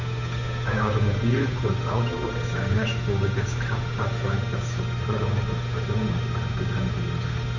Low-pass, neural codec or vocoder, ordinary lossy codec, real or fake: 7.2 kHz; codec, 16 kHz, 8 kbps, FunCodec, trained on Chinese and English, 25 frames a second; none; fake